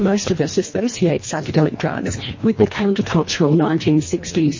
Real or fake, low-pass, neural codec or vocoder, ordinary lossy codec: fake; 7.2 kHz; codec, 24 kHz, 1.5 kbps, HILCodec; MP3, 32 kbps